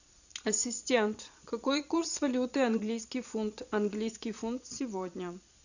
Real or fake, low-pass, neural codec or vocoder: fake; 7.2 kHz; vocoder, 22.05 kHz, 80 mel bands, Vocos